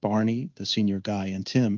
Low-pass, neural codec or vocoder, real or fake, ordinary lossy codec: 7.2 kHz; codec, 16 kHz in and 24 kHz out, 1 kbps, XY-Tokenizer; fake; Opus, 24 kbps